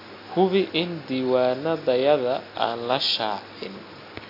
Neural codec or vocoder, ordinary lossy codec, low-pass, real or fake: none; AAC, 32 kbps; 5.4 kHz; real